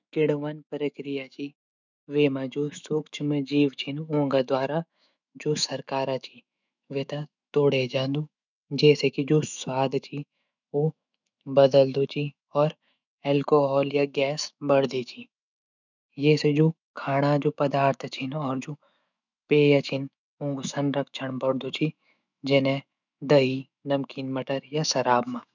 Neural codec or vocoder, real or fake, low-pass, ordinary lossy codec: autoencoder, 48 kHz, 128 numbers a frame, DAC-VAE, trained on Japanese speech; fake; 7.2 kHz; none